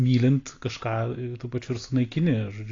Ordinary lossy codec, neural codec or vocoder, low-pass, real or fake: AAC, 32 kbps; none; 7.2 kHz; real